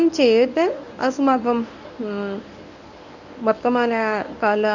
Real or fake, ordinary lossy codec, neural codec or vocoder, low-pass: fake; none; codec, 24 kHz, 0.9 kbps, WavTokenizer, medium speech release version 1; 7.2 kHz